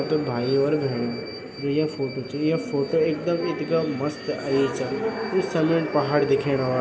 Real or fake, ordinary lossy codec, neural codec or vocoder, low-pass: real; none; none; none